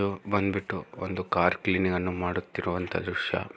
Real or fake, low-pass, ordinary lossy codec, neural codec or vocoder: real; none; none; none